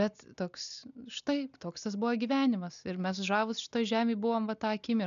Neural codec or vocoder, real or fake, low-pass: none; real; 7.2 kHz